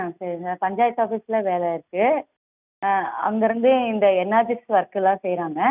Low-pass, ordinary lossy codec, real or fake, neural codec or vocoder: 3.6 kHz; none; real; none